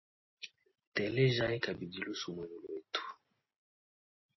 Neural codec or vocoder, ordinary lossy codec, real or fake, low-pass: none; MP3, 24 kbps; real; 7.2 kHz